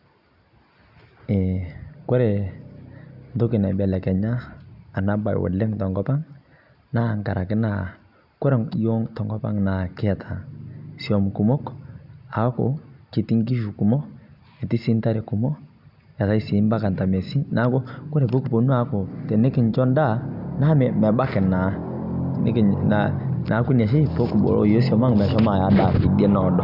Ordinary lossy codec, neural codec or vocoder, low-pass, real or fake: none; none; 5.4 kHz; real